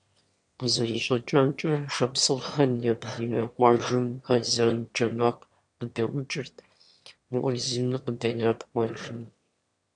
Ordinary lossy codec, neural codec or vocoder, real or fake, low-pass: MP3, 64 kbps; autoencoder, 22.05 kHz, a latent of 192 numbers a frame, VITS, trained on one speaker; fake; 9.9 kHz